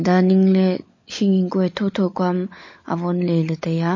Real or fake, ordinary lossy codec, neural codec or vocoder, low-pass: fake; MP3, 32 kbps; codec, 16 kHz, 16 kbps, FunCodec, trained on LibriTTS, 50 frames a second; 7.2 kHz